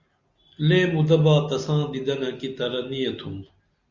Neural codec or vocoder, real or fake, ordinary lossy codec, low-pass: none; real; Opus, 64 kbps; 7.2 kHz